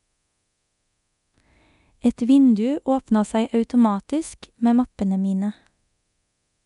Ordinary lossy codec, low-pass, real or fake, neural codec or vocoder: none; 10.8 kHz; fake; codec, 24 kHz, 0.9 kbps, DualCodec